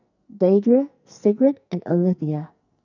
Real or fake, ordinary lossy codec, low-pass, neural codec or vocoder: fake; none; 7.2 kHz; codec, 44.1 kHz, 2.6 kbps, SNAC